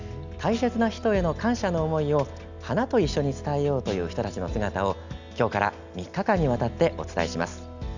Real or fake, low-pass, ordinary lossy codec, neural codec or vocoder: real; 7.2 kHz; none; none